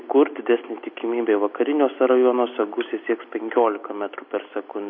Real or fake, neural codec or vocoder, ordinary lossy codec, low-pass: real; none; MP3, 32 kbps; 7.2 kHz